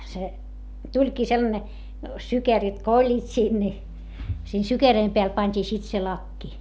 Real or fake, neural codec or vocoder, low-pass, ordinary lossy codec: real; none; none; none